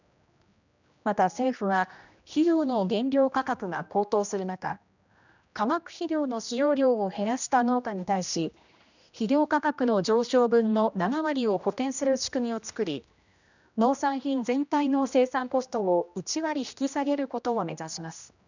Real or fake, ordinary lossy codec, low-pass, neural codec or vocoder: fake; none; 7.2 kHz; codec, 16 kHz, 1 kbps, X-Codec, HuBERT features, trained on general audio